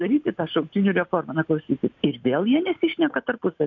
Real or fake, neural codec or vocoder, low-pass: real; none; 7.2 kHz